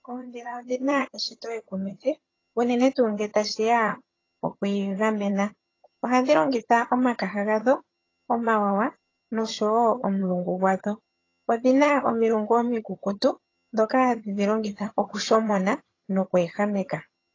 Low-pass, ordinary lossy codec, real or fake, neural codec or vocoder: 7.2 kHz; AAC, 32 kbps; fake; vocoder, 22.05 kHz, 80 mel bands, HiFi-GAN